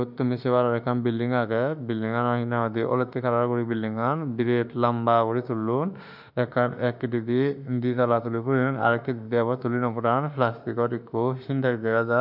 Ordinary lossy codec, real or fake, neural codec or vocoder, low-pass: none; fake; autoencoder, 48 kHz, 32 numbers a frame, DAC-VAE, trained on Japanese speech; 5.4 kHz